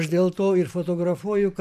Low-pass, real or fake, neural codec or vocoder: 14.4 kHz; fake; vocoder, 44.1 kHz, 128 mel bands every 512 samples, BigVGAN v2